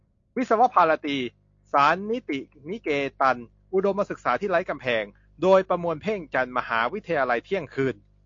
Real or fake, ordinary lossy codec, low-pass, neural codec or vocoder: real; AAC, 48 kbps; 7.2 kHz; none